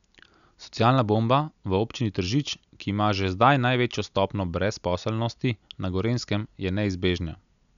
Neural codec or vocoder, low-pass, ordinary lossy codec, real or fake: none; 7.2 kHz; none; real